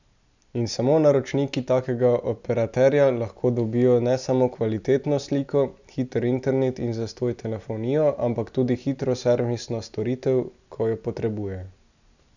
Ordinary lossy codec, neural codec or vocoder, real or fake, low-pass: none; none; real; 7.2 kHz